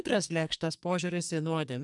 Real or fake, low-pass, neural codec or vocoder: fake; 10.8 kHz; codec, 44.1 kHz, 2.6 kbps, SNAC